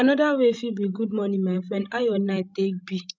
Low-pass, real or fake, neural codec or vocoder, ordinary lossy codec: none; fake; codec, 16 kHz, 16 kbps, FreqCodec, larger model; none